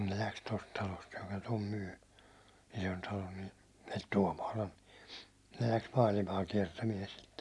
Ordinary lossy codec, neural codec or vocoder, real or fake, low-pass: none; none; real; none